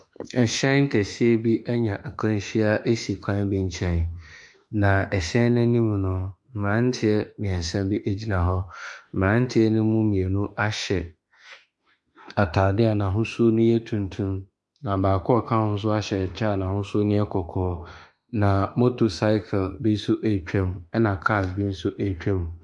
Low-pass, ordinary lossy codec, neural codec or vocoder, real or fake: 10.8 kHz; MP3, 64 kbps; autoencoder, 48 kHz, 32 numbers a frame, DAC-VAE, trained on Japanese speech; fake